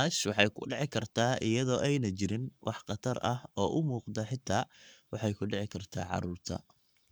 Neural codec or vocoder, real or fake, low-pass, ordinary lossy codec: codec, 44.1 kHz, 7.8 kbps, Pupu-Codec; fake; none; none